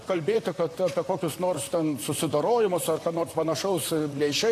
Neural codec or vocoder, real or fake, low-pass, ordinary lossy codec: vocoder, 44.1 kHz, 128 mel bands, Pupu-Vocoder; fake; 14.4 kHz; AAC, 48 kbps